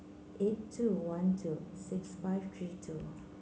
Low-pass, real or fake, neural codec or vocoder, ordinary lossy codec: none; real; none; none